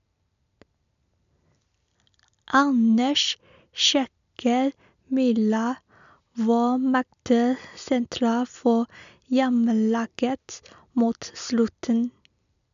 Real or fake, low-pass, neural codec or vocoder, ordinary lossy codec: real; 7.2 kHz; none; MP3, 96 kbps